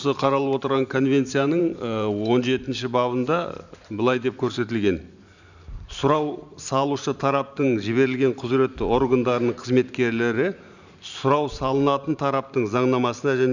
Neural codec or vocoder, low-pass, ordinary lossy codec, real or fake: vocoder, 44.1 kHz, 128 mel bands every 512 samples, BigVGAN v2; 7.2 kHz; none; fake